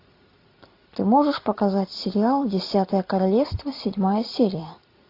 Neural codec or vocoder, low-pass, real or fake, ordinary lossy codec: none; 5.4 kHz; real; AAC, 32 kbps